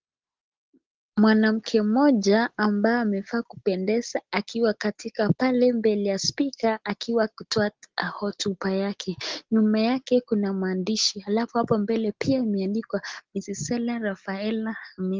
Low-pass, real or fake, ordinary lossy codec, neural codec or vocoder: 7.2 kHz; real; Opus, 16 kbps; none